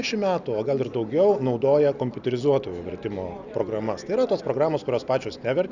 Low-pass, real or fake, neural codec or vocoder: 7.2 kHz; real; none